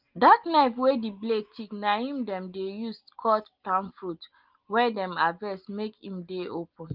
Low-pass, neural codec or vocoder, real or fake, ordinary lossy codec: 5.4 kHz; none; real; Opus, 32 kbps